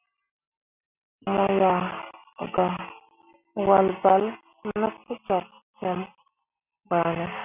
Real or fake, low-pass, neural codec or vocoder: real; 3.6 kHz; none